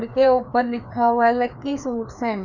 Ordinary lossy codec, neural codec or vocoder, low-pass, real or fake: none; codec, 16 kHz, 2 kbps, FreqCodec, larger model; 7.2 kHz; fake